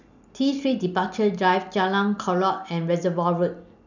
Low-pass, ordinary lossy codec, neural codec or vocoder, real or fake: 7.2 kHz; none; none; real